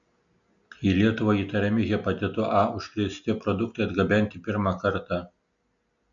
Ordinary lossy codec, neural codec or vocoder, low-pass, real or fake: MP3, 64 kbps; none; 7.2 kHz; real